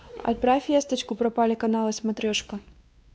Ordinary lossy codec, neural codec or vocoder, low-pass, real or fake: none; codec, 16 kHz, 2 kbps, X-Codec, WavLM features, trained on Multilingual LibriSpeech; none; fake